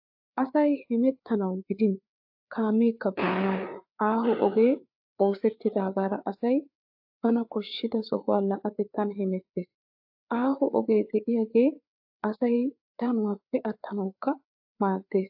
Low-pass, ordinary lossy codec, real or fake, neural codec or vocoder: 5.4 kHz; AAC, 48 kbps; fake; codec, 16 kHz, 4 kbps, FreqCodec, larger model